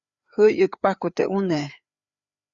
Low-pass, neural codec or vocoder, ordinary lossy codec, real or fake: 7.2 kHz; codec, 16 kHz, 4 kbps, FreqCodec, larger model; Opus, 64 kbps; fake